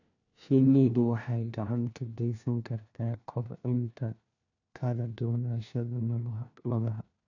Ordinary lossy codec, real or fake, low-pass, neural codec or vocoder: none; fake; 7.2 kHz; codec, 16 kHz, 1 kbps, FunCodec, trained on LibriTTS, 50 frames a second